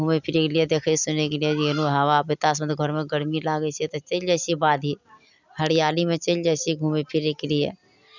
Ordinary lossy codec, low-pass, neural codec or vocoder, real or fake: none; 7.2 kHz; none; real